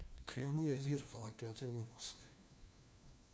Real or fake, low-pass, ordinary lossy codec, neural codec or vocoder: fake; none; none; codec, 16 kHz, 1 kbps, FunCodec, trained on LibriTTS, 50 frames a second